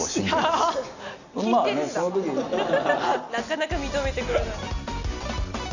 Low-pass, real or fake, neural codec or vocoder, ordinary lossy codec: 7.2 kHz; real; none; none